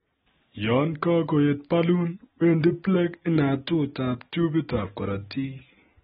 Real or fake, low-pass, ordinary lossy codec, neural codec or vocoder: real; 7.2 kHz; AAC, 16 kbps; none